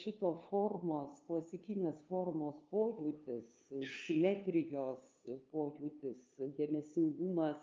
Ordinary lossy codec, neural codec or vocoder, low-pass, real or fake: Opus, 24 kbps; codec, 16 kHz, 2 kbps, FunCodec, trained on LibriTTS, 25 frames a second; 7.2 kHz; fake